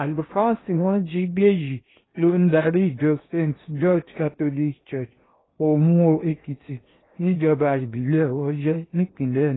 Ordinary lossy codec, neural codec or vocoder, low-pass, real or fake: AAC, 16 kbps; codec, 16 kHz in and 24 kHz out, 0.8 kbps, FocalCodec, streaming, 65536 codes; 7.2 kHz; fake